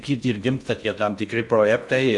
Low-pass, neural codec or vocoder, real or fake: 10.8 kHz; codec, 16 kHz in and 24 kHz out, 0.6 kbps, FocalCodec, streaming, 4096 codes; fake